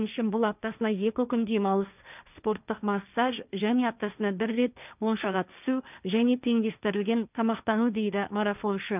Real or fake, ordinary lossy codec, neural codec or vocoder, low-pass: fake; none; codec, 16 kHz, 1.1 kbps, Voila-Tokenizer; 3.6 kHz